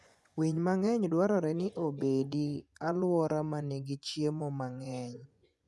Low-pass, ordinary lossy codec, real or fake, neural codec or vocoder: none; none; fake; vocoder, 24 kHz, 100 mel bands, Vocos